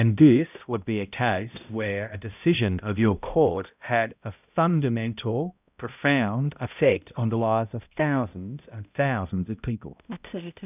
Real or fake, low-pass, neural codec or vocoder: fake; 3.6 kHz; codec, 16 kHz, 0.5 kbps, X-Codec, HuBERT features, trained on balanced general audio